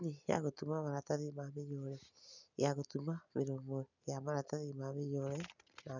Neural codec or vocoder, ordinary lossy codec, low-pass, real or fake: none; none; 7.2 kHz; real